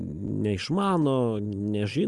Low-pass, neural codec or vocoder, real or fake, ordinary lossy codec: 10.8 kHz; none; real; Opus, 32 kbps